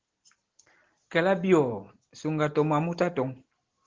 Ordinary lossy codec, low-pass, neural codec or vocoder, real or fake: Opus, 16 kbps; 7.2 kHz; none; real